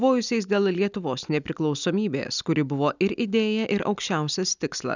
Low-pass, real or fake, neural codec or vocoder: 7.2 kHz; real; none